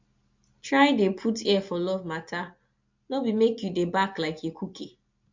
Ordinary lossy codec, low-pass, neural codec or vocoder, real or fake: MP3, 48 kbps; 7.2 kHz; none; real